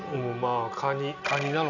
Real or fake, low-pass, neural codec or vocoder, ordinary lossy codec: real; 7.2 kHz; none; none